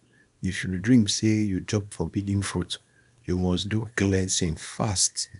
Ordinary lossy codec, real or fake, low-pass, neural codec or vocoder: none; fake; 10.8 kHz; codec, 24 kHz, 0.9 kbps, WavTokenizer, small release